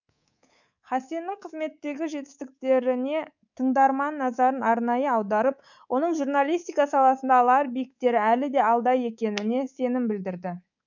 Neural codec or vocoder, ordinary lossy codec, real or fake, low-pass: codec, 24 kHz, 3.1 kbps, DualCodec; none; fake; 7.2 kHz